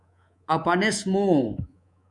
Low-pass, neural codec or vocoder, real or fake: 10.8 kHz; codec, 24 kHz, 3.1 kbps, DualCodec; fake